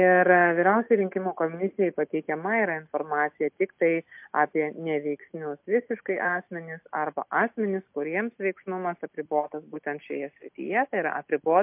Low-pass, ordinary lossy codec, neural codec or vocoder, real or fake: 3.6 kHz; MP3, 32 kbps; none; real